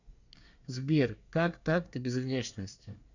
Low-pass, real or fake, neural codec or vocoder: 7.2 kHz; fake; codec, 24 kHz, 1 kbps, SNAC